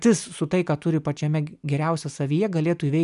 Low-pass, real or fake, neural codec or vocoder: 10.8 kHz; real; none